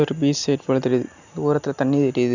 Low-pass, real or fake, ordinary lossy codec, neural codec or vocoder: 7.2 kHz; real; none; none